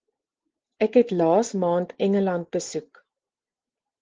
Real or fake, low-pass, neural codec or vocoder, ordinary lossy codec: real; 7.2 kHz; none; Opus, 16 kbps